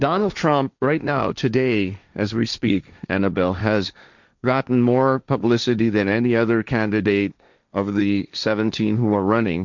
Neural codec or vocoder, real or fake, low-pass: codec, 16 kHz, 1.1 kbps, Voila-Tokenizer; fake; 7.2 kHz